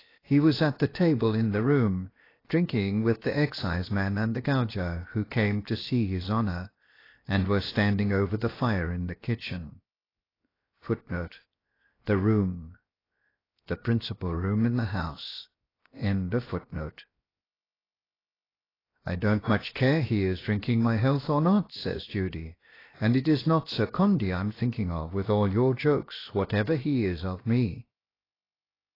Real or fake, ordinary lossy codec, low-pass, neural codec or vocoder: fake; AAC, 24 kbps; 5.4 kHz; codec, 16 kHz, 0.7 kbps, FocalCodec